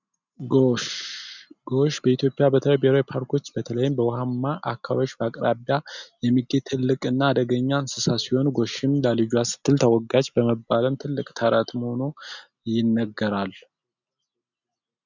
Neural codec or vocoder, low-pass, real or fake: none; 7.2 kHz; real